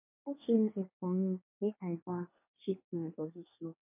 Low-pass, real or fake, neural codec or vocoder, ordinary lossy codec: 3.6 kHz; fake; codec, 24 kHz, 1 kbps, SNAC; MP3, 32 kbps